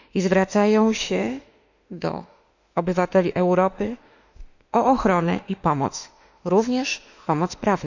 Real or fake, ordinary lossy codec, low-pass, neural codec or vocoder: fake; none; 7.2 kHz; autoencoder, 48 kHz, 32 numbers a frame, DAC-VAE, trained on Japanese speech